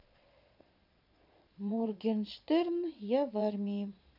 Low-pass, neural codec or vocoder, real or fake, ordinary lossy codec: 5.4 kHz; vocoder, 22.05 kHz, 80 mel bands, Vocos; fake; none